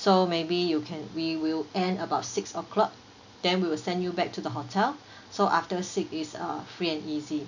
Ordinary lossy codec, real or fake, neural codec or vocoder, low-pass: none; real; none; 7.2 kHz